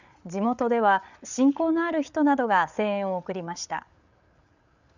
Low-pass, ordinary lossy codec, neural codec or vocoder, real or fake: 7.2 kHz; none; codec, 16 kHz, 8 kbps, FreqCodec, larger model; fake